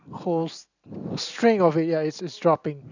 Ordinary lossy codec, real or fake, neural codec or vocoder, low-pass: none; real; none; 7.2 kHz